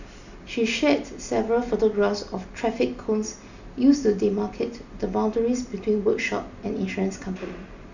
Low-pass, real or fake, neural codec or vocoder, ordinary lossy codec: 7.2 kHz; real; none; none